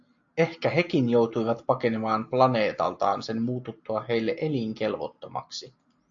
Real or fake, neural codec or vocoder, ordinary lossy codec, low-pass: real; none; AAC, 64 kbps; 7.2 kHz